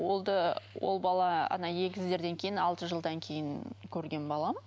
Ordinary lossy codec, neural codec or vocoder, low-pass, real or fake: none; none; none; real